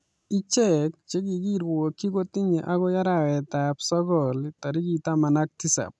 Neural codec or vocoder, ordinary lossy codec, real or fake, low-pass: none; none; real; none